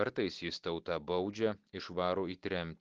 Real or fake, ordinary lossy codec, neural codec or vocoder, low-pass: real; Opus, 16 kbps; none; 7.2 kHz